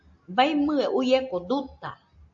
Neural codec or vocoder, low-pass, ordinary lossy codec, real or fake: none; 7.2 kHz; AAC, 64 kbps; real